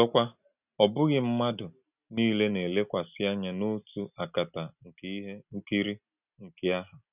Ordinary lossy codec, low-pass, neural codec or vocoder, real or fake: none; 3.6 kHz; none; real